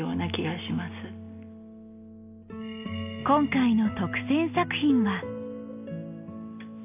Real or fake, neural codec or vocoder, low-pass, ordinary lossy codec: real; none; 3.6 kHz; AAC, 32 kbps